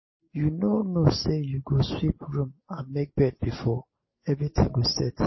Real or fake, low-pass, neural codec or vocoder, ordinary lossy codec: real; 7.2 kHz; none; MP3, 24 kbps